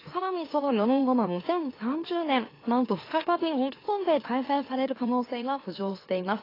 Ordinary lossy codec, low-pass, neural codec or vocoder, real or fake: AAC, 24 kbps; 5.4 kHz; autoencoder, 44.1 kHz, a latent of 192 numbers a frame, MeloTTS; fake